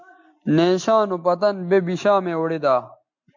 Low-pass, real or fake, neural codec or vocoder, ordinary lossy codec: 7.2 kHz; real; none; MP3, 48 kbps